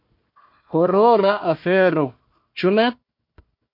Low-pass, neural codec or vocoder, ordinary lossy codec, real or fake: 5.4 kHz; codec, 16 kHz, 1 kbps, FunCodec, trained on Chinese and English, 50 frames a second; MP3, 32 kbps; fake